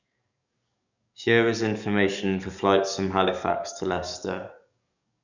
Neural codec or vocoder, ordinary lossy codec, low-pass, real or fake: codec, 44.1 kHz, 7.8 kbps, DAC; none; 7.2 kHz; fake